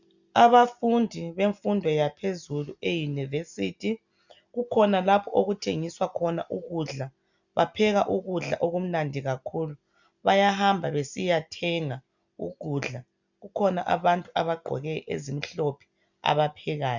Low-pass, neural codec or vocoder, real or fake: 7.2 kHz; none; real